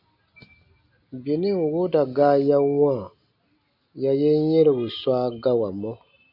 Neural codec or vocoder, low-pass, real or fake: none; 5.4 kHz; real